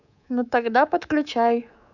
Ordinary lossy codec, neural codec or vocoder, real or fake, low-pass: none; codec, 24 kHz, 3.1 kbps, DualCodec; fake; 7.2 kHz